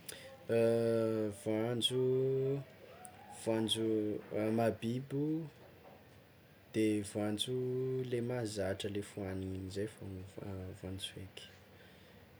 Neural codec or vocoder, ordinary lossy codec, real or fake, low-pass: none; none; real; none